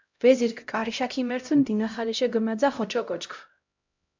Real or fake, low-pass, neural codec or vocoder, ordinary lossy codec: fake; 7.2 kHz; codec, 16 kHz, 0.5 kbps, X-Codec, HuBERT features, trained on LibriSpeech; MP3, 64 kbps